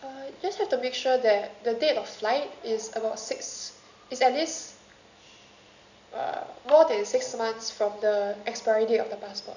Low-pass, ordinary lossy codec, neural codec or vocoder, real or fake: 7.2 kHz; none; none; real